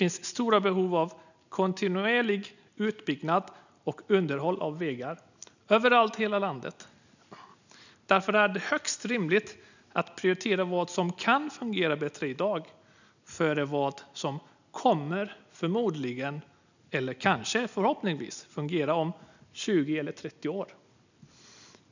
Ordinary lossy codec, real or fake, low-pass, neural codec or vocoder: none; real; 7.2 kHz; none